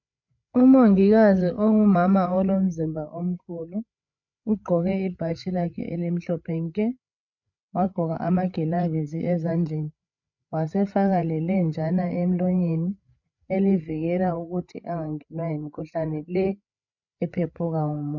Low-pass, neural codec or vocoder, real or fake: 7.2 kHz; codec, 16 kHz, 8 kbps, FreqCodec, larger model; fake